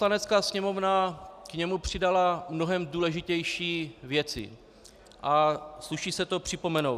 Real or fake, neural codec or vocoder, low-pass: real; none; 14.4 kHz